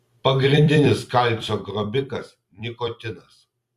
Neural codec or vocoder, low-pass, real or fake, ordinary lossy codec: vocoder, 44.1 kHz, 128 mel bands every 256 samples, BigVGAN v2; 14.4 kHz; fake; Opus, 64 kbps